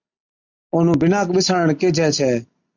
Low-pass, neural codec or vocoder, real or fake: 7.2 kHz; none; real